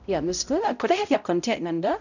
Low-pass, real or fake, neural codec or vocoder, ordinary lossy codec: 7.2 kHz; fake; codec, 16 kHz, 0.5 kbps, X-Codec, HuBERT features, trained on balanced general audio; none